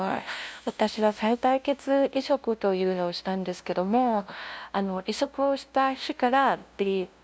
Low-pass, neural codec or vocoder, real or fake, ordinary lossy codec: none; codec, 16 kHz, 0.5 kbps, FunCodec, trained on LibriTTS, 25 frames a second; fake; none